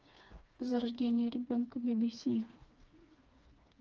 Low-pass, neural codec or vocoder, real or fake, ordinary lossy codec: 7.2 kHz; codec, 16 kHz, 2 kbps, FreqCodec, smaller model; fake; Opus, 24 kbps